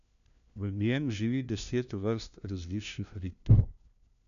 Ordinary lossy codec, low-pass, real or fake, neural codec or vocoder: none; 7.2 kHz; fake; codec, 16 kHz, 1 kbps, FunCodec, trained on LibriTTS, 50 frames a second